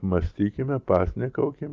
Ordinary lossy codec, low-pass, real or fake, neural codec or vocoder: Opus, 32 kbps; 7.2 kHz; real; none